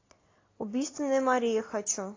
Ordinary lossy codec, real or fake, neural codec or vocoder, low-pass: AAC, 32 kbps; real; none; 7.2 kHz